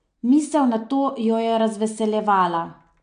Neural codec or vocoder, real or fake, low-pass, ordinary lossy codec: none; real; 9.9 kHz; MP3, 64 kbps